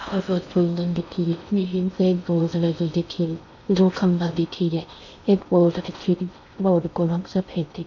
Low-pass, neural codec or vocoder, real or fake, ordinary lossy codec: 7.2 kHz; codec, 16 kHz in and 24 kHz out, 0.6 kbps, FocalCodec, streaming, 4096 codes; fake; none